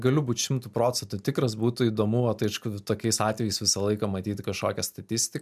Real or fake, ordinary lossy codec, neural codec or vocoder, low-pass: real; MP3, 96 kbps; none; 14.4 kHz